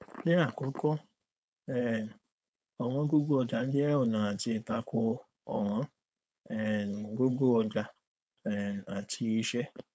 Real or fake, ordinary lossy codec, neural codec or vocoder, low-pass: fake; none; codec, 16 kHz, 4.8 kbps, FACodec; none